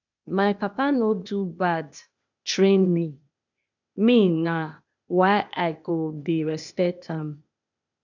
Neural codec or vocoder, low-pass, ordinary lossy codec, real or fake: codec, 16 kHz, 0.8 kbps, ZipCodec; 7.2 kHz; none; fake